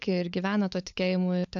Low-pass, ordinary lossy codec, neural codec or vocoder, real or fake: 7.2 kHz; AAC, 64 kbps; codec, 16 kHz, 16 kbps, FunCodec, trained on LibriTTS, 50 frames a second; fake